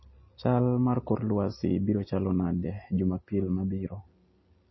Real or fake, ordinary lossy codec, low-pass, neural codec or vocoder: real; MP3, 24 kbps; 7.2 kHz; none